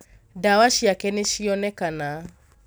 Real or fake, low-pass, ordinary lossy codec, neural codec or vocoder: real; none; none; none